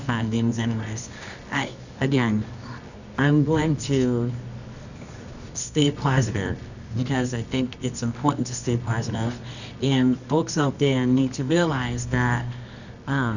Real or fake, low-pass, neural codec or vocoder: fake; 7.2 kHz; codec, 24 kHz, 0.9 kbps, WavTokenizer, medium music audio release